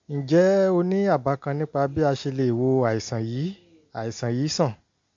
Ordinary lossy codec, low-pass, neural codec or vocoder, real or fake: MP3, 48 kbps; 7.2 kHz; none; real